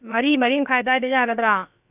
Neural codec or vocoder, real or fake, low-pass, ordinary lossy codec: codec, 16 kHz, about 1 kbps, DyCAST, with the encoder's durations; fake; 3.6 kHz; none